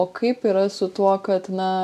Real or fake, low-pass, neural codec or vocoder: real; 14.4 kHz; none